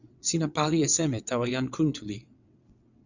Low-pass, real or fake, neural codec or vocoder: 7.2 kHz; fake; vocoder, 22.05 kHz, 80 mel bands, WaveNeXt